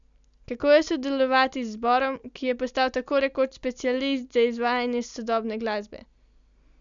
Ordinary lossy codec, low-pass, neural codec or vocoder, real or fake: none; 7.2 kHz; none; real